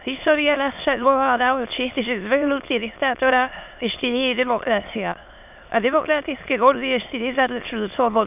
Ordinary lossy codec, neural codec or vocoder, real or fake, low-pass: none; autoencoder, 22.05 kHz, a latent of 192 numbers a frame, VITS, trained on many speakers; fake; 3.6 kHz